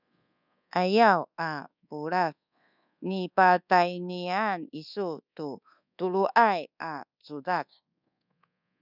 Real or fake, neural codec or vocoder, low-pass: fake; codec, 24 kHz, 1.2 kbps, DualCodec; 5.4 kHz